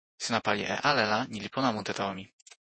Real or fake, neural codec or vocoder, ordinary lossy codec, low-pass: fake; vocoder, 48 kHz, 128 mel bands, Vocos; MP3, 32 kbps; 9.9 kHz